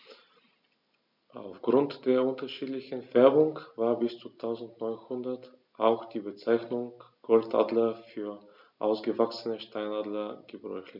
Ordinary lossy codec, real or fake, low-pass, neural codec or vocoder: none; real; 5.4 kHz; none